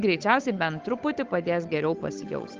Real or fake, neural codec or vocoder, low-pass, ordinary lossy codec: fake; codec, 16 kHz, 8 kbps, FunCodec, trained on Chinese and English, 25 frames a second; 7.2 kHz; Opus, 32 kbps